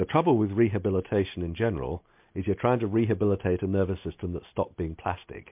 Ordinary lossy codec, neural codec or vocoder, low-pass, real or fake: MP3, 32 kbps; none; 3.6 kHz; real